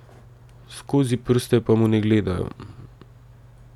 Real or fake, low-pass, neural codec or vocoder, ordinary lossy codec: real; 19.8 kHz; none; none